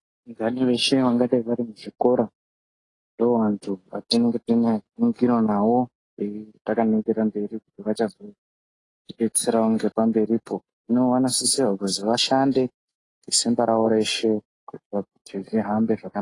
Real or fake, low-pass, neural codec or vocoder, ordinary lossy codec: real; 10.8 kHz; none; AAC, 32 kbps